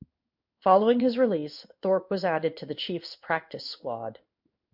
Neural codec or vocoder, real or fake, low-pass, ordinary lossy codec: codec, 16 kHz in and 24 kHz out, 1 kbps, XY-Tokenizer; fake; 5.4 kHz; MP3, 48 kbps